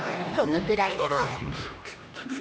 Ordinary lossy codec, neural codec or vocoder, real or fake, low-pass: none; codec, 16 kHz, 1 kbps, X-Codec, HuBERT features, trained on LibriSpeech; fake; none